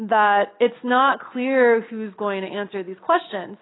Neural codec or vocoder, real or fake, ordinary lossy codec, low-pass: none; real; AAC, 16 kbps; 7.2 kHz